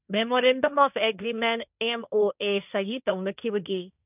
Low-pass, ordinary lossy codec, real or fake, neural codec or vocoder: 3.6 kHz; none; fake; codec, 16 kHz, 1.1 kbps, Voila-Tokenizer